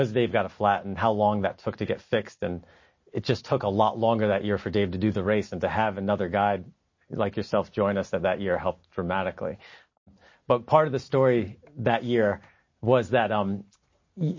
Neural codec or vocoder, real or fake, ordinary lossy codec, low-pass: none; real; MP3, 32 kbps; 7.2 kHz